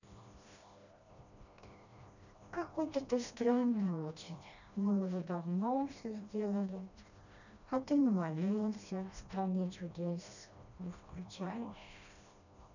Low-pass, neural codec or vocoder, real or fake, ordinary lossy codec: 7.2 kHz; codec, 16 kHz, 1 kbps, FreqCodec, smaller model; fake; none